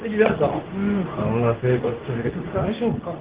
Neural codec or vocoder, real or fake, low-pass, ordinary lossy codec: codec, 24 kHz, 0.9 kbps, WavTokenizer, medium speech release version 2; fake; 3.6 kHz; Opus, 16 kbps